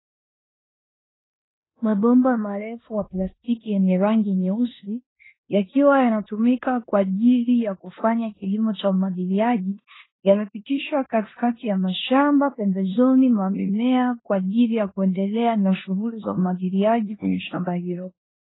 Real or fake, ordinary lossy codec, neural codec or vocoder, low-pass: fake; AAC, 16 kbps; codec, 16 kHz in and 24 kHz out, 0.9 kbps, LongCat-Audio-Codec, four codebook decoder; 7.2 kHz